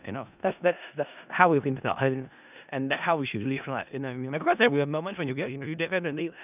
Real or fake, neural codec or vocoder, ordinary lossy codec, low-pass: fake; codec, 16 kHz in and 24 kHz out, 0.4 kbps, LongCat-Audio-Codec, four codebook decoder; none; 3.6 kHz